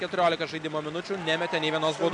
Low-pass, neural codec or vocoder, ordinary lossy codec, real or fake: 10.8 kHz; none; MP3, 96 kbps; real